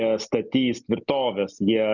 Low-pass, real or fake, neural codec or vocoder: 7.2 kHz; real; none